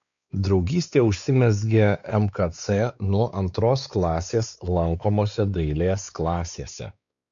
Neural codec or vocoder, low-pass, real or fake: codec, 16 kHz, 4 kbps, X-Codec, WavLM features, trained on Multilingual LibriSpeech; 7.2 kHz; fake